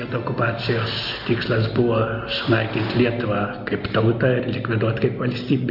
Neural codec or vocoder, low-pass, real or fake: none; 5.4 kHz; real